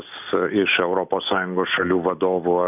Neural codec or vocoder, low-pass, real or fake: none; 3.6 kHz; real